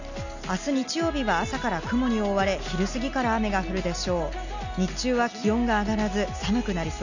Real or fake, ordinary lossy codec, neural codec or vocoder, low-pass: real; none; none; 7.2 kHz